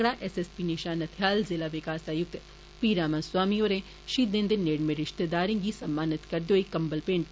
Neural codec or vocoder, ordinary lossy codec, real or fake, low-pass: none; none; real; none